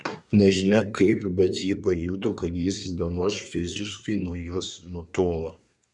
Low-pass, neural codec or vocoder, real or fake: 10.8 kHz; codec, 24 kHz, 1 kbps, SNAC; fake